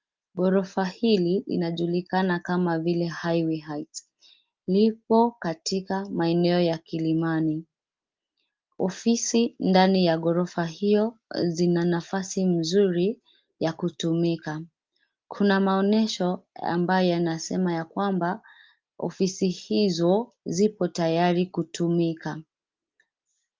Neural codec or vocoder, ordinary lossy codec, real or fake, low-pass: none; Opus, 32 kbps; real; 7.2 kHz